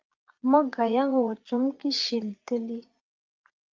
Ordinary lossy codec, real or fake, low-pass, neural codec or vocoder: Opus, 24 kbps; fake; 7.2 kHz; vocoder, 22.05 kHz, 80 mel bands, Vocos